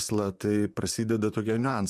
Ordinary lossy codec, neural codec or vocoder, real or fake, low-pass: MP3, 96 kbps; vocoder, 44.1 kHz, 128 mel bands, Pupu-Vocoder; fake; 14.4 kHz